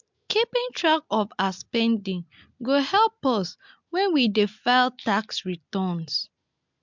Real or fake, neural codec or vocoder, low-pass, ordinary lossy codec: real; none; 7.2 kHz; MP3, 64 kbps